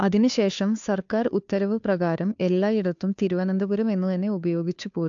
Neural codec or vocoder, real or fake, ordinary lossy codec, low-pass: codec, 16 kHz, 2 kbps, FunCodec, trained on Chinese and English, 25 frames a second; fake; AAC, 64 kbps; 7.2 kHz